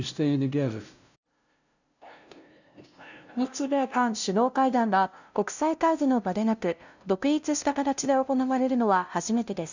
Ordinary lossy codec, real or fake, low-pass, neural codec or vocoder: none; fake; 7.2 kHz; codec, 16 kHz, 0.5 kbps, FunCodec, trained on LibriTTS, 25 frames a second